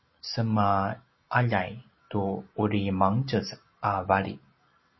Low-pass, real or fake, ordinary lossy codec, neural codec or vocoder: 7.2 kHz; real; MP3, 24 kbps; none